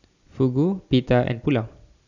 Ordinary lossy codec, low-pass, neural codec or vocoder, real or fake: none; 7.2 kHz; none; real